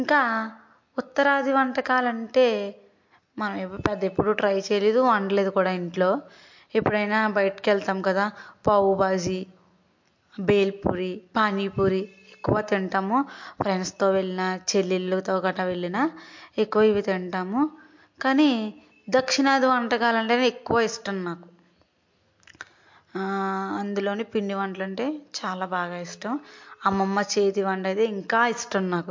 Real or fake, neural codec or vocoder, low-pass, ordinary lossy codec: real; none; 7.2 kHz; MP3, 48 kbps